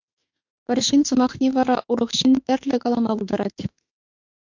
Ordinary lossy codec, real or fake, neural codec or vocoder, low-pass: MP3, 48 kbps; fake; autoencoder, 48 kHz, 32 numbers a frame, DAC-VAE, trained on Japanese speech; 7.2 kHz